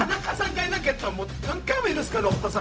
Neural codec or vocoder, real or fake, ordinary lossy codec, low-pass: codec, 16 kHz, 0.4 kbps, LongCat-Audio-Codec; fake; none; none